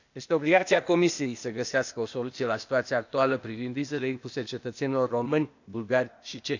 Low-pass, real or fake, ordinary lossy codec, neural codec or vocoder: 7.2 kHz; fake; none; codec, 16 kHz, 0.8 kbps, ZipCodec